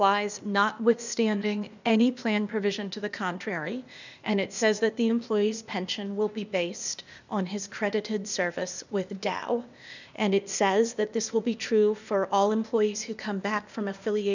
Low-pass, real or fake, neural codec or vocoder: 7.2 kHz; fake; codec, 16 kHz, 0.8 kbps, ZipCodec